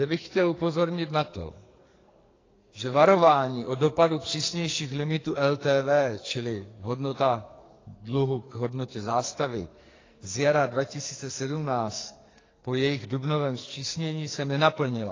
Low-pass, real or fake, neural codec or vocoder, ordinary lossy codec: 7.2 kHz; fake; codec, 44.1 kHz, 2.6 kbps, SNAC; AAC, 32 kbps